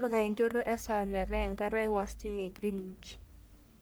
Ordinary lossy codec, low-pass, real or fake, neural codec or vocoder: none; none; fake; codec, 44.1 kHz, 1.7 kbps, Pupu-Codec